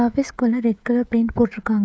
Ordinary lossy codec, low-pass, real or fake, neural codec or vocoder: none; none; fake; codec, 16 kHz, 16 kbps, FreqCodec, smaller model